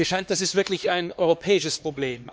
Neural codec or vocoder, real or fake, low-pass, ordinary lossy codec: codec, 16 kHz, 2 kbps, X-Codec, HuBERT features, trained on LibriSpeech; fake; none; none